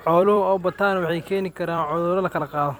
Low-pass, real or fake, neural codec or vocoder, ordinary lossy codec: none; fake; vocoder, 44.1 kHz, 128 mel bands every 256 samples, BigVGAN v2; none